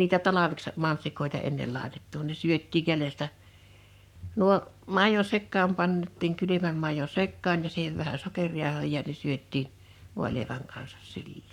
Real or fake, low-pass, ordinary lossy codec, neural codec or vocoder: fake; 19.8 kHz; none; codec, 44.1 kHz, 7.8 kbps, Pupu-Codec